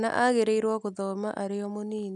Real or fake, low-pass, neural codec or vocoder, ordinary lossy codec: real; none; none; none